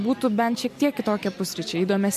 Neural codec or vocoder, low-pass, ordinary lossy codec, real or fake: none; 14.4 kHz; MP3, 64 kbps; real